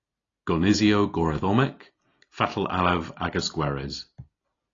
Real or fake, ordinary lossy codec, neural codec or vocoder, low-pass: real; AAC, 32 kbps; none; 7.2 kHz